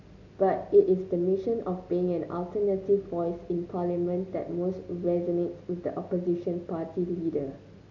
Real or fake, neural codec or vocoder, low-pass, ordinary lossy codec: real; none; 7.2 kHz; none